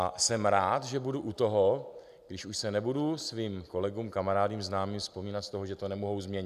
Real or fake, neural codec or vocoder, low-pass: real; none; 14.4 kHz